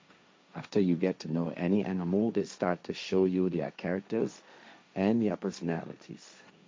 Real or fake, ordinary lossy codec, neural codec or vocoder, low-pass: fake; none; codec, 16 kHz, 1.1 kbps, Voila-Tokenizer; none